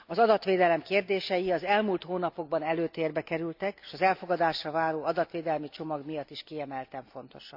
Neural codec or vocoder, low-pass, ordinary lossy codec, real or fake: none; 5.4 kHz; AAC, 48 kbps; real